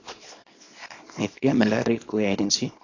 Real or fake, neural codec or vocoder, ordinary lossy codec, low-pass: fake; codec, 24 kHz, 0.9 kbps, WavTokenizer, small release; AAC, 32 kbps; 7.2 kHz